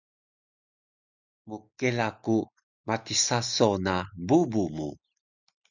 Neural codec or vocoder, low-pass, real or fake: none; 7.2 kHz; real